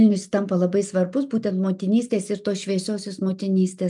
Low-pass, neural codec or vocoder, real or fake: 10.8 kHz; none; real